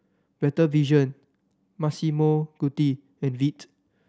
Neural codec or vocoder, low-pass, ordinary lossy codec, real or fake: none; none; none; real